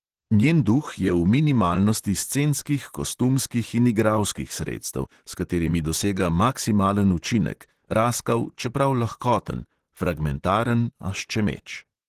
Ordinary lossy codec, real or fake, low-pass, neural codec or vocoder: Opus, 16 kbps; fake; 14.4 kHz; vocoder, 44.1 kHz, 128 mel bands, Pupu-Vocoder